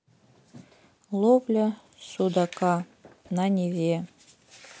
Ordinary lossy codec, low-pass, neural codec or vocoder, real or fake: none; none; none; real